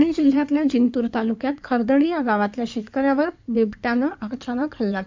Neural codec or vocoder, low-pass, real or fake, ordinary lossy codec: codec, 16 kHz in and 24 kHz out, 2.2 kbps, FireRedTTS-2 codec; 7.2 kHz; fake; MP3, 64 kbps